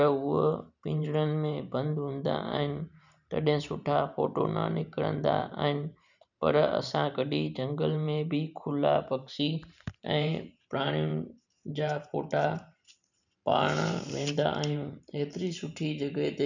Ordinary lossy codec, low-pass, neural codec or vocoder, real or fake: none; 7.2 kHz; vocoder, 44.1 kHz, 128 mel bands every 512 samples, BigVGAN v2; fake